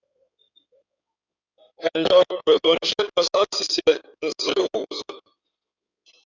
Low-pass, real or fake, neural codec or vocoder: 7.2 kHz; fake; codec, 16 kHz in and 24 kHz out, 2.2 kbps, FireRedTTS-2 codec